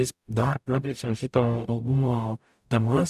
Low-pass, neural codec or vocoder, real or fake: 14.4 kHz; codec, 44.1 kHz, 0.9 kbps, DAC; fake